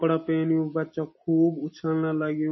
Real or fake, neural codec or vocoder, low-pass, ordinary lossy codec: real; none; 7.2 kHz; MP3, 24 kbps